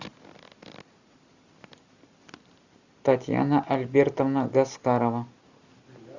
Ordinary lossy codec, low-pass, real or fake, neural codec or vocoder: Opus, 64 kbps; 7.2 kHz; real; none